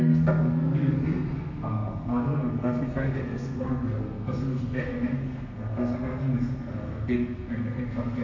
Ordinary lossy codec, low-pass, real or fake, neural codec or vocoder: none; 7.2 kHz; fake; codec, 32 kHz, 1.9 kbps, SNAC